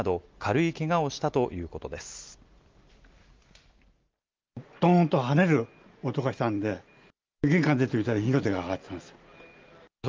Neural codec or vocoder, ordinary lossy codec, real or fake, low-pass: none; Opus, 24 kbps; real; 7.2 kHz